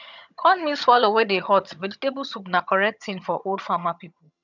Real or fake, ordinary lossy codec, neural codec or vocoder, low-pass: fake; none; vocoder, 22.05 kHz, 80 mel bands, HiFi-GAN; 7.2 kHz